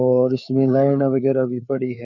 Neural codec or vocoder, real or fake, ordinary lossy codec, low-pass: codec, 16 kHz, 4 kbps, FreqCodec, larger model; fake; none; 7.2 kHz